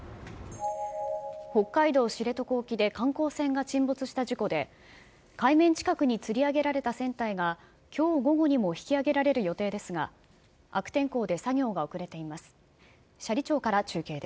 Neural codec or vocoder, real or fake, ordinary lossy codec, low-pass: none; real; none; none